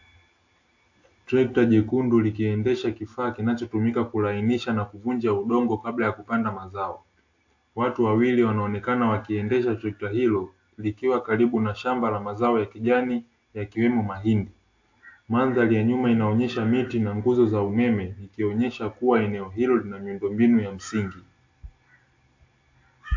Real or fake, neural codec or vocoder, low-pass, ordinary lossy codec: real; none; 7.2 kHz; AAC, 48 kbps